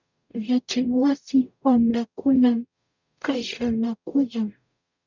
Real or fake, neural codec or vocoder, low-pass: fake; codec, 44.1 kHz, 0.9 kbps, DAC; 7.2 kHz